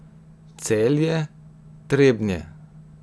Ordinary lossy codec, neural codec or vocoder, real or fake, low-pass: none; none; real; none